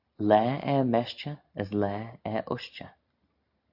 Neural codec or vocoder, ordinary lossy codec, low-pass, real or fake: none; AAC, 48 kbps; 5.4 kHz; real